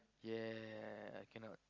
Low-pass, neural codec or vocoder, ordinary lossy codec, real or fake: 7.2 kHz; none; Opus, 32 kbps; real